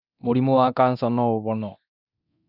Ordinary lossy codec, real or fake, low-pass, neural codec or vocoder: none; fake; 5.4 kHz; codec, 24 kHz, 0.9 kbps, DualCodec